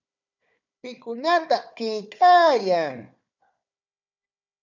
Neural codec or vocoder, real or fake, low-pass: codec, 16 kHz, 4 kbps, FunCodec, trained on Chinese and English, 50 frames a second; fake; 7.2 kHz